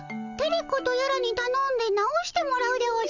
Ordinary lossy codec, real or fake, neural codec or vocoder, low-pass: none; real; none; 7.2 kHz